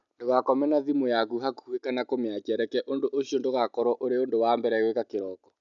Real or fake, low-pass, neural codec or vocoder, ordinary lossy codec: real; 7.2 kHz; none; none